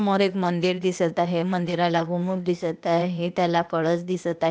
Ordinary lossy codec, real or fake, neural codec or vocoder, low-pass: none; fake; codec, 16 kHz, 0.8 kbps, ZipCodec; none